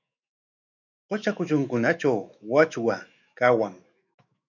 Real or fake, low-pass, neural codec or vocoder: fake; 7.2 kHz; autoencoder, 48 kHz, 128 numbers a frame, DAC-VAE, trained on Japanese speech